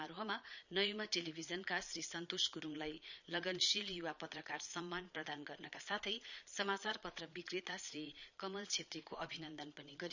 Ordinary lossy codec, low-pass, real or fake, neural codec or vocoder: none; 7.2 kHz; fake; vocoder, 22.05 kHz, 80 mel bands, Vocos